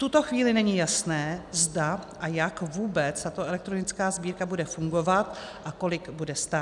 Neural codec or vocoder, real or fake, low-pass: none; real; 10.8 kHz